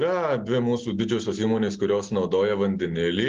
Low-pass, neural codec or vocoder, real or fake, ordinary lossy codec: 7.2 kHz; none; real; Opus, 16 kbps